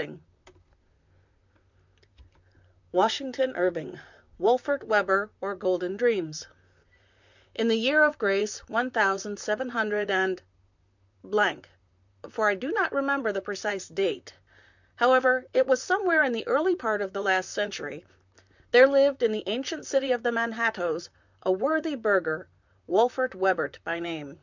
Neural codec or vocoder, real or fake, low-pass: vocoder, 44.1 kHz, 128 mel bands, Pupu-Vocoder; fake; 7.2 kHz